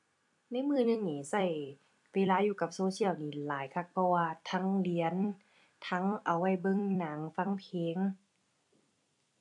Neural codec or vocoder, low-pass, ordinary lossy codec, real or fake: vocoder, 44.1 kHz, 128 mel bands every 256 samples, BigVGAN v2; 10.8 kHz; AAC, 64 kbps; fake